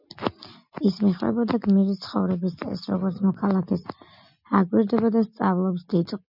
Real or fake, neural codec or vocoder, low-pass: real; none; 5.4 kHz